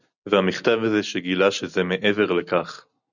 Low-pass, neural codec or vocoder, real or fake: 7.2 kHz; none; real